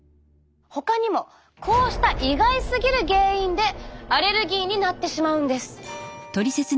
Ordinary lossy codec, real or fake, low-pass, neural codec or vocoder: none; real; none; none